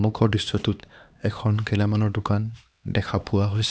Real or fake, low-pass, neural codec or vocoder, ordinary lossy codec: fake; none; codec, 16 kHz, 2 kbps, X-Codec, HuBERT features, trained on LibriSpeech; none